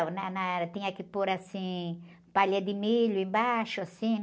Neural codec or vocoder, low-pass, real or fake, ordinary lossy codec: none; none; real; none